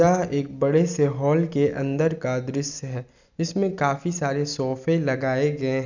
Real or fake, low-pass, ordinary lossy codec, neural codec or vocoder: real; 7.2 kHz; none; none